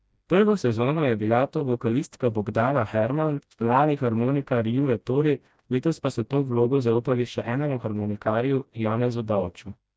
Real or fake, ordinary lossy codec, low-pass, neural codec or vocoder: fake; none; none; codec, 16 kHz, 1 kbps, FreqCodec, smaller model